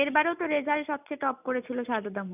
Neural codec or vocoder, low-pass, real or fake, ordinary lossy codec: none; 3.6 kHz; real; none